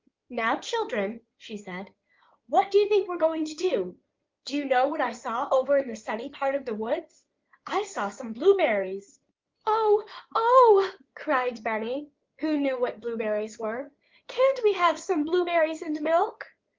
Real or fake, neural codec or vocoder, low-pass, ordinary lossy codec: fake; codec, 16 kHz in and 24 kHz out, 2.2 kbps, FireRedTTS-2 codec; 7.2 kHz; Opus, 32 kbps